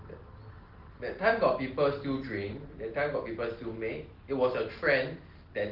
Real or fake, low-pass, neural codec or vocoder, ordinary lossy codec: real; 5.4 kHz; none; Opus, 16 kbps